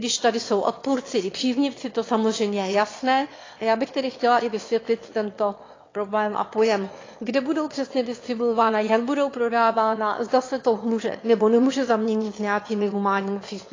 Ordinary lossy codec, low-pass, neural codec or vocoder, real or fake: AAC, 32 kbps; 7.2 kHz; autoencoder, 22.05 kHz, a latent of 192 numbers a frame, VITS, trained on one speaker; fake